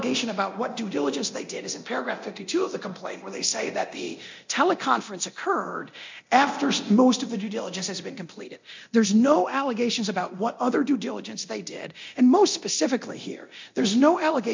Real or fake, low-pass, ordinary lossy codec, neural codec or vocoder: fake; 7.2 kHz; MP3, 48 kbps; codec, 24 kHz, 0.9 kbps, DualCodec